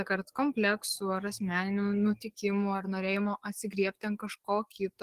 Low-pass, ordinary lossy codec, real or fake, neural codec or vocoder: 14.4 kHz; Opus, 16 kbps; fake; codec, 44.1 kHz, 7.8 kbps, DAC